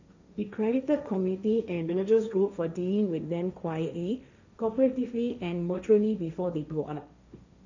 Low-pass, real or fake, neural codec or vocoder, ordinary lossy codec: 7.2 kHz; fake; codec, 16 kHz, 1.1 kbps, Voila-Tokenizer; none